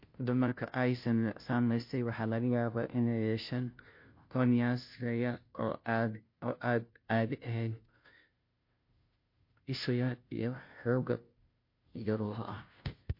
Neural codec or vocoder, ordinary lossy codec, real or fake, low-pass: codec, 16 kHz, 0.5 kbps, FunCodec, trained on Chinese and English, 25 frames a second; MP3, 32 kbps; fake; 5.4 kHz